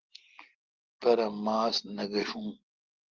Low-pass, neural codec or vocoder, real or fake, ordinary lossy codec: 7.2 kHz; none; real; Opus, 16 kbps